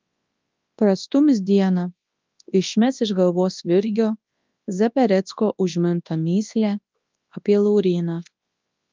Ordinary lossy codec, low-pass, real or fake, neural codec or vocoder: Opus, 24 kbps; 7.2 kHz; fake; codec, 24 kHz, 0.9 kbps, WavTokenizer, large speech release